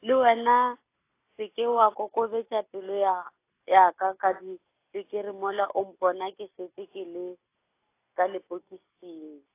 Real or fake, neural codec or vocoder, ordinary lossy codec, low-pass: real; none; AAC, 24 kbps; 3.6 kHz